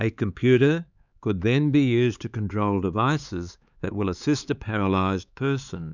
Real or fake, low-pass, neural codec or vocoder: fake; 7.2 kHz; codec, 16 kHz, 4 kbps, X-Codec, HuBERT features, trained on LibriSpeech